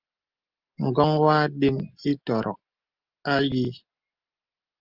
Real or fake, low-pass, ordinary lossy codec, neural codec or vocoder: real; 5.4 kHz; Opus, 32 kbps; none